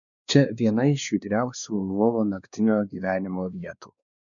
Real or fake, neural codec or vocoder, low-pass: fake; codec, 16 kHz, 2 kbps, X-Codec, WavLM features, trained on Multilingual LibriSpeech; 7.2 kHz